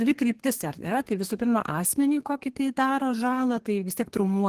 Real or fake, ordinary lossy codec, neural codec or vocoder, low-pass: fake; Opus, 16 kbps; codec, 32 kHz, 1.9 kbps, SNAC; 14.4 kHz